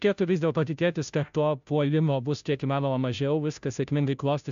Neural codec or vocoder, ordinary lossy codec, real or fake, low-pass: codec, 16 kHz, 0.5 kbps, FunCodec, trained on Chinese and English, 25 frames a second; AAC, 96 kbps; fake; 7.2 kHz